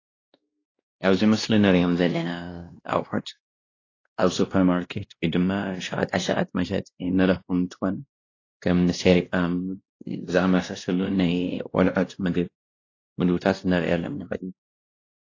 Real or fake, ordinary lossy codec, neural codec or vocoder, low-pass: fake; AAC, 32 kbps; codec, 16 kHz, 1 kbps, X-Codec, WavLM features, trained on Multilingual LibriSpeech; 7.2 kHz